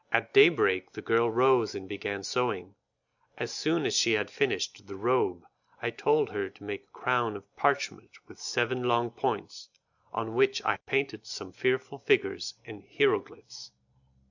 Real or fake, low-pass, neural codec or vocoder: real; 7.2 kHz; none